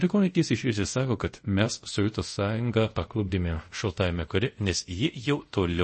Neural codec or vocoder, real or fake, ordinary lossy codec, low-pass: codec, 24 kHz, 0.5 kbps, DualCodec; fake; MP3, 32 kbps; 10.8 kHz